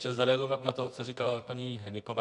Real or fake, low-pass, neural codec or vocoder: fake; 10.8 kHz; codec, 24 kHz, 0.9 kbps, WavTokenizer, medium music audio release